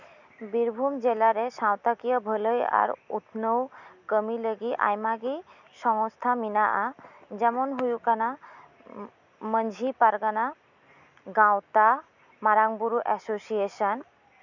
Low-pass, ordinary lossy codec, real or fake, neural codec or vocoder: 7.2 kHz; none; real; none